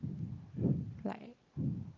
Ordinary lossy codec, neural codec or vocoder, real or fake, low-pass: Opus, 32 kbps; none; real; 7.2 kHz